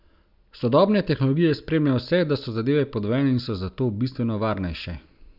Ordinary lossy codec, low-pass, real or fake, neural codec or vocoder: none; 5.4 kHz; real; none